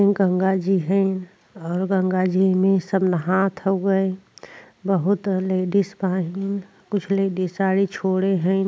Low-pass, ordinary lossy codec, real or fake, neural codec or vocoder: none; none; real; none